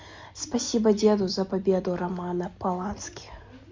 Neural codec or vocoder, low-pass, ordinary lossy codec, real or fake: none; 7.2 kHz; MP3, 64 kbps; real